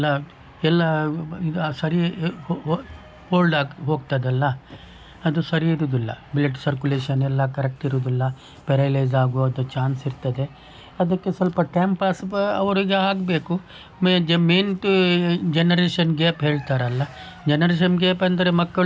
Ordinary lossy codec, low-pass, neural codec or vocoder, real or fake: none; none; none; real